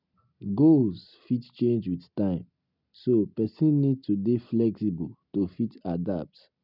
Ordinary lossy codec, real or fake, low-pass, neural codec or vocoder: none; real; 5.4 kHz; none